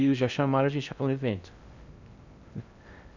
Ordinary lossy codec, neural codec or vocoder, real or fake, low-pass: none; codec, 16 kHz in and 24 kHz out, 0.6 kbps, FocalCodec, streaming, 2048 codes; fake; 7.2 kHz